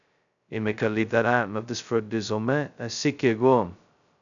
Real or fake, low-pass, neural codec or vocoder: fake; 7.2 kHz; codec, 16 kHz, 0.2 kbps, FocalCodec